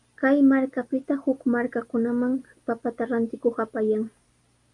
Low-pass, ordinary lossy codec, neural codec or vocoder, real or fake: 10.8 kHz; Opus, 32 kbps; none; real